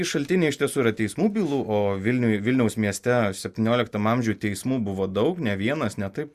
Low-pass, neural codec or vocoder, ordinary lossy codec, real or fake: 14.4 kHz; none; Opus, 64 kbps; real